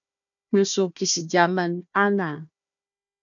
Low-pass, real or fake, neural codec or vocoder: 7.2 kHz; fake; codec, 16 kHz, 1 kbps, FunCodec, trained on Chinese and English, 50 frames a second